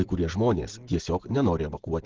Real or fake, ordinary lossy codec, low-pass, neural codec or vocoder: real; Opus, 16 kbps; 7.2 kHz; none